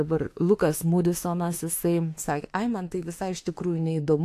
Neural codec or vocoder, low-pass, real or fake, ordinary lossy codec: autoencoder, 48 kHz, 32 numbers a frame, DAC-VAE, trained on Japanese speech; 14.4 kHz; fake; AAC, 64 kbps